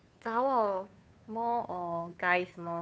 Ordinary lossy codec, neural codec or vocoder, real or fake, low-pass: none; codec, 16 kHz, 2 kbps, FunCodec, trained on Chinese and English, 25 frames a second; fake; none